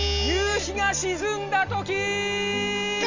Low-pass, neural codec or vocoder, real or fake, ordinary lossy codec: 7.2 kHz; none; real; Opus, 64 kbps